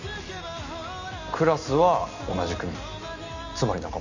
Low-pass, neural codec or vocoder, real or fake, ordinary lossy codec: 7.2 kHz; none; real; none